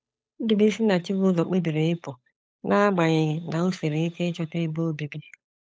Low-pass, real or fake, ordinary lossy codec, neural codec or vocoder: none; fake; none; codec, 16 kHz, 8 kbps, FunCodec, trained on Chinese and English, 25 frames a second